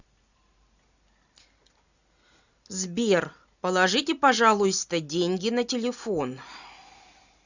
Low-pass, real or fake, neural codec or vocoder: 7.2 kHz; real; none